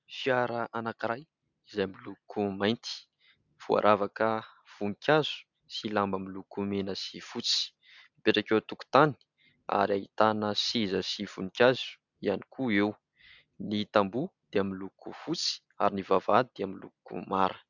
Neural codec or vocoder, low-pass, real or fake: none; 7.2 kHz; real